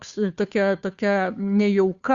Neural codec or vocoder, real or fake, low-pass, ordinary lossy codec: codec, 16 kHz, 2 kbps, FunCodec, trained on Chinese and English, 25 frames a second; fake; 7.2 kHz; Opus, 64 kbps